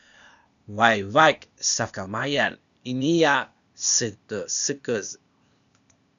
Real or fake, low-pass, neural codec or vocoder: fake; 7.2 kHz; codec, 16 kHz, 0.8 kbps, ZipCodec